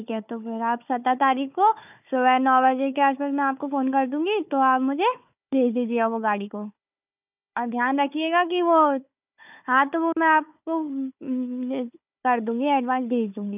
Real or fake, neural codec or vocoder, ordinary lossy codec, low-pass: fake; codec, 16 kHz, 4 kbps, FunCodec, trained on Chinese and English, 50 frames a second; none; 3.6 kHz